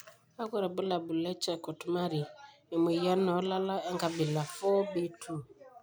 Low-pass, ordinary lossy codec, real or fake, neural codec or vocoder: none; none; real; none